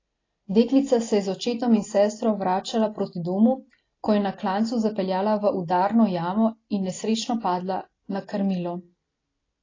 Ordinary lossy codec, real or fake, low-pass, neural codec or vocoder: AAC, 32 kbps; real; 7.2 kHz; none